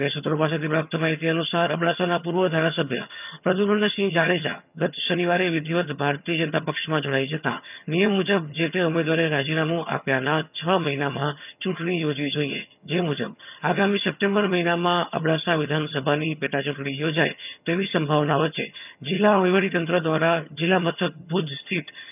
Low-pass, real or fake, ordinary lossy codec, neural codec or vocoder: 3.6 kHz; fake; none; vocoder, 22.05 kHz, 80 mel bands, HiFi-GAN